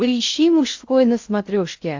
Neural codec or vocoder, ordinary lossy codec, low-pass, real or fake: codec, 16 kHz in and 24 kHz out, 0.6 kbps, FocalCodec, streaming, 4096 codes; AAC, 48 kbps; 7.2 kHz; fake